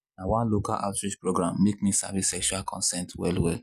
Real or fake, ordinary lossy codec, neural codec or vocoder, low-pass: real; none; none; 14.4 kHz